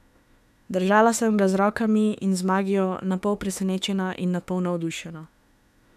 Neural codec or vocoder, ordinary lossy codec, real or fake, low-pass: autoencoder, 48 kHz, 32 numbers a frame, DAC-VAE, trained on Japanese speech; none; fake; 14.4 kHz